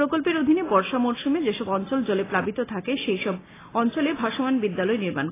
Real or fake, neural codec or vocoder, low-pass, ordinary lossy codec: real; none; 3.6 kHz; AAC, 16 kbps